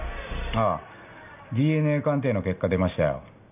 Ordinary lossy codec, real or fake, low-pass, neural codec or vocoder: none; real; 3.6 kHz; none